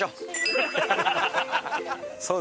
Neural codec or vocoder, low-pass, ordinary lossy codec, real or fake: none; none; none; real